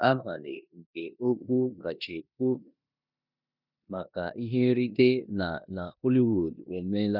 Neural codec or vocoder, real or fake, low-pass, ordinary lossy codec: codec, 16 kHz in and 24 kHz out, 0.9 kbps, LongCat-Audio-Codec, four codebook decoder; fake; 5.4 kHz; none